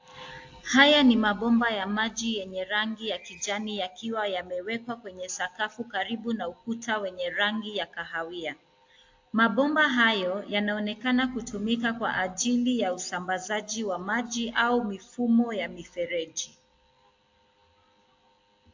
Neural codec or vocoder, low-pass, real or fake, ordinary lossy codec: none; 7.2 kHz; real; AAC, 48 kbps